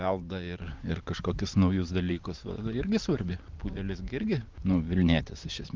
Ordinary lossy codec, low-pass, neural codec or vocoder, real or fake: Opus, 24 kbps; 7.2 kHz; vocoder, 44.1 kHz, 128 mel bands every 512 samples, BigVGAN v2; fake